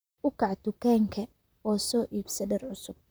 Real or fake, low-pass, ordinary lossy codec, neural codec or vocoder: fake; none; none; vocoder, 44.1 kHz, 128 mel bands, Pupu-Vocoder